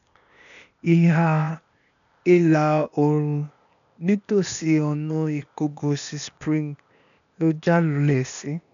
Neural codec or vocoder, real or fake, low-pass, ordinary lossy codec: codec, 16 kHz, 0.8 kbps, ZipCodec; fake; 7.2 kHz; none